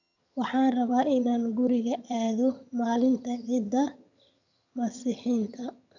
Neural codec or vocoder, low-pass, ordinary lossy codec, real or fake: vocoder, 22.05 kHz, 80 mel bands, HiFi-GAN; 7.2 kHz; none; fake